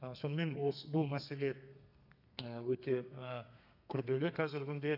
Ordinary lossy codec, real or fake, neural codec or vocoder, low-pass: none; fake; codec, 32 kHz, 1.9 kbps, SNAC; 5.4 kHz